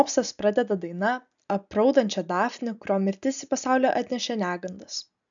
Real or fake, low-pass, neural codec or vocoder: real; 7.2 kHz; none